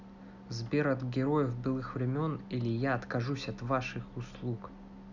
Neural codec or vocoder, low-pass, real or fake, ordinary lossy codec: none; 7.2 kHz; real; none